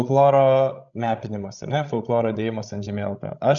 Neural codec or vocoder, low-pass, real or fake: codec, 16 kHz, 16 kbps, FreqCodec, larger model; 7.2 kHz; fake